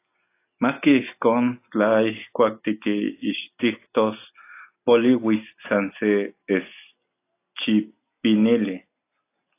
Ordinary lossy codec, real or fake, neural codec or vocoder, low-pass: AAC, 24 kbps; real; none; 3.6 kHz